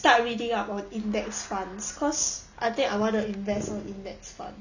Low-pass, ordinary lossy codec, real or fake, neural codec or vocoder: 7.2 kHz; none; real; none